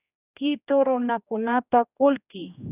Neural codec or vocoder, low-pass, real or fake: codec, 16 kHz, 1 kbps, X-Codec, HuBERT features, trained on general audio; 3.6 kHz; fake